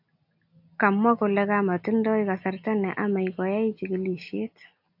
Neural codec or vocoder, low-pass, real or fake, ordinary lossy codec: none; 5.4 kHz; real; AAC, 48 kbps